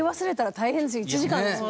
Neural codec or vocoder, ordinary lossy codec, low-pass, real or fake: none; none; none; real